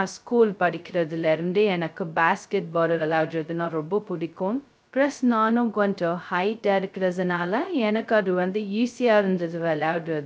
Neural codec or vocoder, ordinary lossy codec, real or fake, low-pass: codec, 16 kHz, 0.2 kbps, FocalCodec; none; fake; none